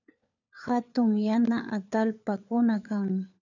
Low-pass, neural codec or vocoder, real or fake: 7.2 kHz; codec, 16 kHz, 4 kbps, FunCodec, trained on LibriTTS, 50 frames a second; fake